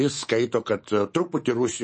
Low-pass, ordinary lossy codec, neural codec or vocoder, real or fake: 10.8 kHz; MP3, 32 kbps; codec, 24 kHz, 3.1 kbps, DualCodec; fake